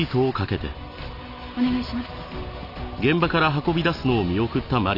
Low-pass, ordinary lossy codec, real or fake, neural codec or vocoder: 5.4 kHz; none; real; none